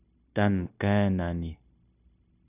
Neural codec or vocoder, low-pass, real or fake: codec, 16 kHz, 0.9 kbps, LongCat-Audio-Codec; 3.6 kHz; fake